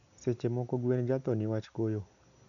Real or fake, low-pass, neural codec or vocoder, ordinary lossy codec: real; 7.2 kHz; none; none